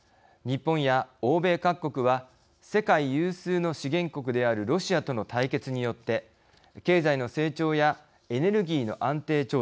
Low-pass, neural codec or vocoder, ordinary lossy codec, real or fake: none; none; none; real